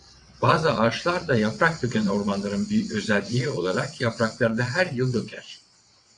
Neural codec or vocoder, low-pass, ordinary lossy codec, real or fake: vocoder, 22.05 kHz, 80 mel bands, WaveNeXt; 9.9 kHz; AAC, 64 kbps; fake